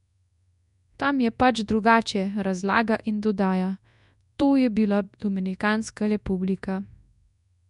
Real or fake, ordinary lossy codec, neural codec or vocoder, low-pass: fake; none; codec, 24 kHz, 0.9 kbps, WavTokenizer, large speech release; 10.8 kHz